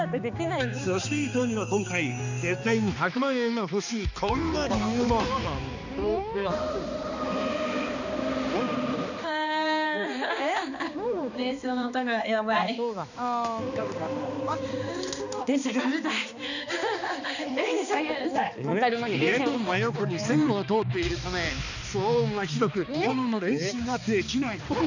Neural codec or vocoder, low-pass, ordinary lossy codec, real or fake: codec, 16 kHz, 2 kbps, X-Codec, HuBERT features, trained on balanced general audio; 7.2 kHz; none; fake